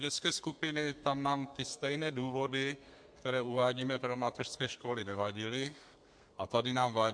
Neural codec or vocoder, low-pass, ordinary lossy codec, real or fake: codec, 32 kHz, 1.9 kbps, SNAC; 9.9 kHz; MP3, 64 kbps; fake